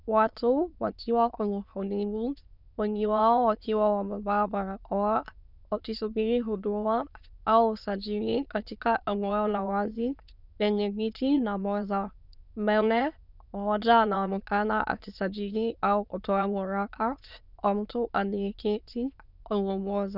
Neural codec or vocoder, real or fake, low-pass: autoencoder, 22.05 kHz, a latent of 192 numbers a frame, VITS, trained on many speakers; fake; 5.4 kHz